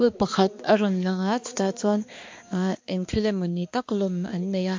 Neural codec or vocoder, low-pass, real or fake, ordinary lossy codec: codec, 16 kHz, 2 kbps, X-Codec, HuBERT features, trained on balanced general audio; 7.2 kHz; fake; MP3, 48 kbps